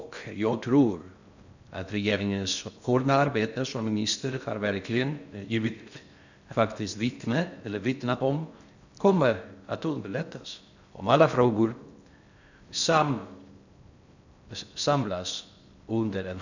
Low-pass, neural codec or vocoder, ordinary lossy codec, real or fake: 7.2 kHz; codec, 16 kHz in and 24 kHz out, 0.6 kbps, FocalCodec, streaming, 2048 codes; none; fake